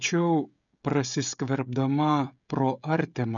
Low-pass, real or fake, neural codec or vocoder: 7.2 kHz; fake; codec, 16 kHz, 16 kbps, FreqCodec, smaller model